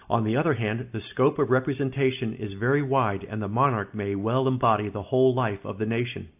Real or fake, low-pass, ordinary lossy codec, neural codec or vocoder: real; 3.6 kHz; AAC, 32 kbps; none